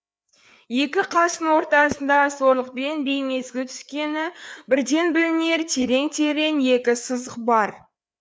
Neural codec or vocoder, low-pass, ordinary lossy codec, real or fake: codec, 16 kHz, 4 kbps, FreqCodec, larger model; none; none; fake